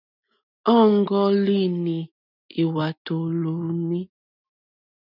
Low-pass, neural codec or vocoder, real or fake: 5.4 kHz; none; real